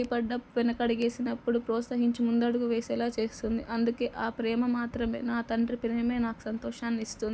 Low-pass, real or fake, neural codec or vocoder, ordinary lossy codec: none; real; none; none